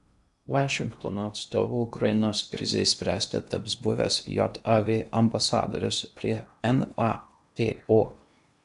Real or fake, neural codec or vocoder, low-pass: fake; codec, 16 kHz in and 24 kHz out, 0.8 kbps, FocalCodec, streaming, 65536 codes; 10.8 kHz